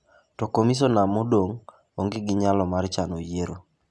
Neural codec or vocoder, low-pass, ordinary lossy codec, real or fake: none; none; none; real